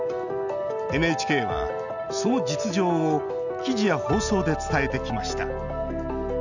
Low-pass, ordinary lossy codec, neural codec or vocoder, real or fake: 7.2 kHz; none; none; real